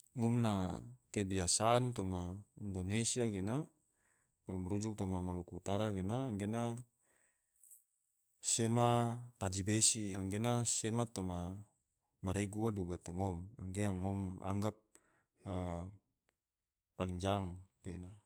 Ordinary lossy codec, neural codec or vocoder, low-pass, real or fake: none; codec, 44.1 kHz, 2.6 kbps, SNAC; none; fake